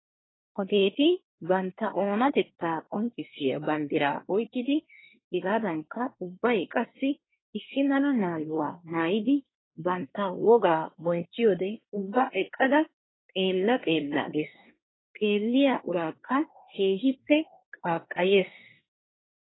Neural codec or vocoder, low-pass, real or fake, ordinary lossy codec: codec, 24 kHz, 1 kbps, SNAC; 7.2 kHz; fake; AAC, 16 kbps